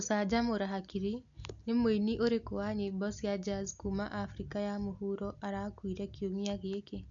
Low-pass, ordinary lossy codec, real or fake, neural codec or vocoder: 7.2 kHz; none; real; none